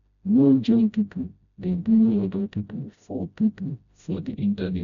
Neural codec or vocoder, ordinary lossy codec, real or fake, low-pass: codec, 16 kHz, 0.5 kbps, FreqCodec, smaller model; none; fake; 7.2 kHz